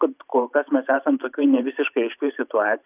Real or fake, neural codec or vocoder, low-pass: fake; vocoder, 44.1 kHz, 128 mel bands every 512 samples, BigVGAN v2; 3.6 kHz